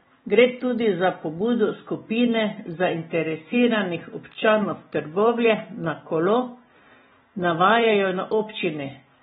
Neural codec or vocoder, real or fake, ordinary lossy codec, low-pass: none; real; AAC, 16 kbps; 7.2 kHz